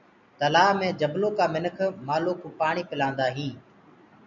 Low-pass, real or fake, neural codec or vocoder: 7.2 kHz; real; none